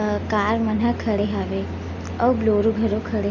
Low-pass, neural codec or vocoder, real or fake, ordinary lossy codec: 7.2 kHz; none; real; none